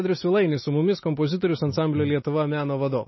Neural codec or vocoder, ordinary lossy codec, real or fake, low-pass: none; MP3, 24 kbps; real; 7.2 kHz